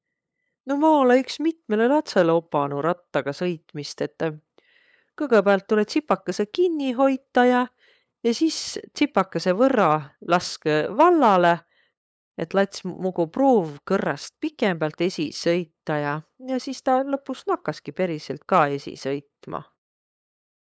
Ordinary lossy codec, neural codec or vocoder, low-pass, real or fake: none; codec, 16 kHz, 8 kbps, FunCodec, trained on LibriTTS, 25 frames a second; none; fake